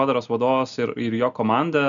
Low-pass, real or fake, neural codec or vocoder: 7.2 kHz; real; none